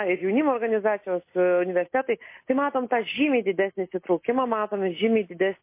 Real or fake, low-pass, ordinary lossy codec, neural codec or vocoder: real; 3.6 kHz; MP3, 24 kbps; none